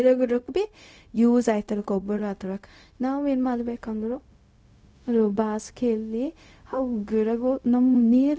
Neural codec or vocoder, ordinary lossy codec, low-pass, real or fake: codec, 16 kHz, 0.4 kbps, LongCat-Audio-Codec; none; none; fake